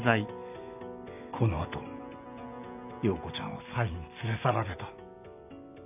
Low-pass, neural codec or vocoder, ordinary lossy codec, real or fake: 3.6 kHz; none; none; real